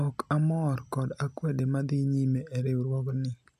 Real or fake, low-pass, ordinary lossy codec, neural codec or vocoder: real; 14.4 kHz; none; none